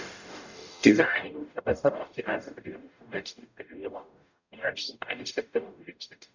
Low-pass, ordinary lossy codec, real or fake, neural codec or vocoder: 7.2 kHz; none; fake; codec, 44.1 kHz, 0.9 kbps, DAC